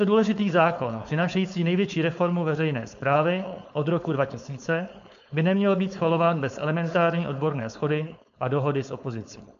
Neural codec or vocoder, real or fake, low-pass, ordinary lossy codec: codec, 16 kHz, 4.8 kbps, FACodec; fake; 7.2 kHz; AAC, 96 kbps